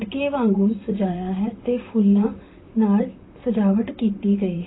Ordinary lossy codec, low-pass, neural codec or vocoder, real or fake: AAC, 16 kbps; 7.2 kHz; vocoder, 44.1 kHz, 128 mel bands, Pupu-Vocoder; fake